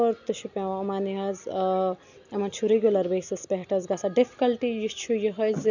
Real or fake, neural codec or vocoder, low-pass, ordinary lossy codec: real; none; 7.2 kHz; none